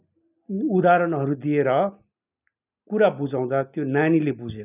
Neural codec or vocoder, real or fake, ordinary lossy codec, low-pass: none; real; none; 3.6 kHz